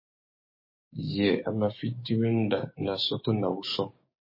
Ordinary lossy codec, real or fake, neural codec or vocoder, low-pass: MP3, 24 kbps; fake; codec, 16 kHz, 6 kbps, DAC; 5.4 kHz